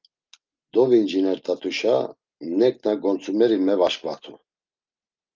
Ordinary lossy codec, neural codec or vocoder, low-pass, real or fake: Opus, 24 kbps; none; 7.2 kHz; real